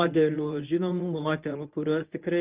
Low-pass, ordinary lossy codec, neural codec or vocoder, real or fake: 3.6 kHz; Opus, 32 kbps; codec, 24 kHz, 0.9 kbps, WavTokenizer, medium speech release version 1; fake